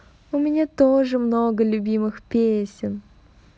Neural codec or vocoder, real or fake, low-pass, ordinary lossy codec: none; real; none; none